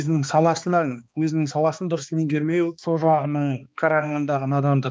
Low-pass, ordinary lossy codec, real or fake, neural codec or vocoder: none; none; fake; codec, 16 kHz, 2 kbps, X-Codec, HuBERT features, trained on balanced general audio